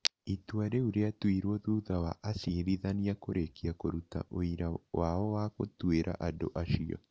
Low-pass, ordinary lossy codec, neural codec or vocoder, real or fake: none; none; none; real